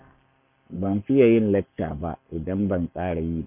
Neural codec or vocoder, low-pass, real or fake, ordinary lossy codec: codec, 44.1 kHz, 7.8 kbps, Pupu-Codec; 3.6 kHz; fake; none